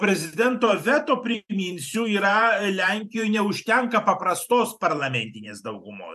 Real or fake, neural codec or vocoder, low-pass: fake; vocoder, 44.1 kHz, 128 mel bands every 512 samples, BigVGAN v2; 14.4 kHz